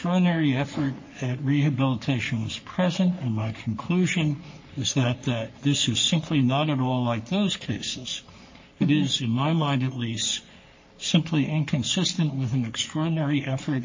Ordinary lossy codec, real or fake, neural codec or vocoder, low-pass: MP3, 32 kbps; fake; codec, 44.1 kHz, 3.4 kbps, Pupu-Codec; 7.2 kHz